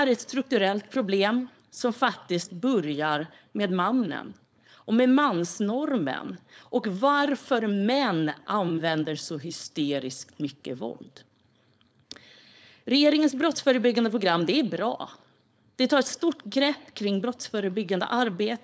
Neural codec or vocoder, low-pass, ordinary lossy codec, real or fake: codec, 16 kHz, 4.8 kbps, FACodec; none; none; fake